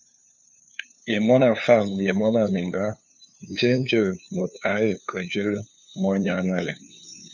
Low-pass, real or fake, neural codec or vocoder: 7.2 kHz; fake; codec, 16 kHz, 2 kbps, FunCodec, trained on LibriTTS, 25 frames a second